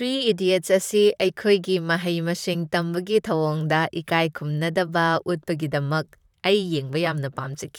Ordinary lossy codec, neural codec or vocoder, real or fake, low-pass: none; vocoder, 44.1 kHz, 128 mel bands, Pupu-Vocoder; fake; 19.8 kHz